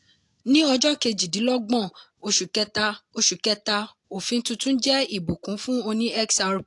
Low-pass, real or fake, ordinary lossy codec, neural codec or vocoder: 10.8 kHz; real; AAC, 64 kbps; none